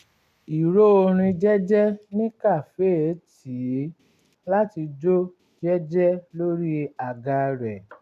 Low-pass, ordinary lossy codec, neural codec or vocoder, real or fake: 14.4 kHz; none; none; real